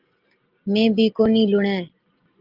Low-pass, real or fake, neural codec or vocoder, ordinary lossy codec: 5.4 kHz; real; none; Opus, 24 kbps